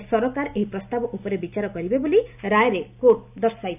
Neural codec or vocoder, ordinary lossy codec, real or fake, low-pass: none; none; real; 3.6 kHz